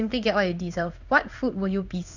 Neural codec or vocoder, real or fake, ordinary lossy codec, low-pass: codec, 16 kHz in and 24 kHz out, 1 kbps, XY-Tokenizer; fake; none; 7.2 kHz